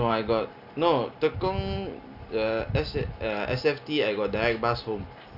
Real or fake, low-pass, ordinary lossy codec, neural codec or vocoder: real; 5.4 kHz; none; none